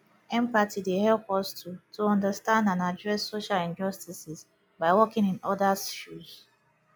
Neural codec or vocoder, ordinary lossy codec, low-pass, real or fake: none; none; none; real